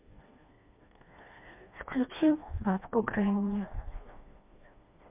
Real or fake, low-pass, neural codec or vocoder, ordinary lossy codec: fake; 3.6 kHz; codec, 16 kHz, 2 kbps, FreqCodec, smaller model; MP3, 32 kbps